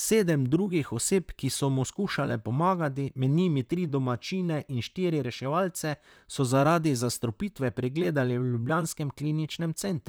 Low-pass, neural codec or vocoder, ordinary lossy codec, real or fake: none; vocoder, 44.1 kHz, 128 mel bands, Pupu-Vocoder; none; fake